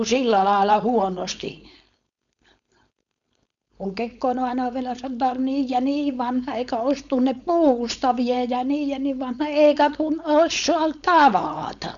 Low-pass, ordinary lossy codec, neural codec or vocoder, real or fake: 7.2 kHz; none; codec, 16 kHz, 4.8 kbps, FACodec; fake